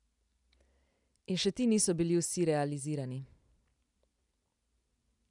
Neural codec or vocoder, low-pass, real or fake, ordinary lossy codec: none; 10.8 kHz; real; none